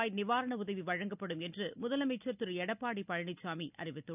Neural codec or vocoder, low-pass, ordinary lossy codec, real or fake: none; 3.6 kHz; none; real